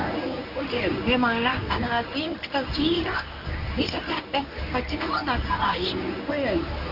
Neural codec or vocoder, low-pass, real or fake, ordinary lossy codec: codec, 24 kHz, 0.9 kbps, WavTokenizer, medium speech release version 1; 5.4 kHz; fake; none